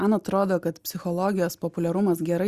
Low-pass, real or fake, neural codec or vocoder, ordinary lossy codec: 14.4 kHz; real; none; Opus, 64 kbps